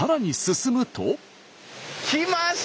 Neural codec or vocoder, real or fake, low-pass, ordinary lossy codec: none; real; none; none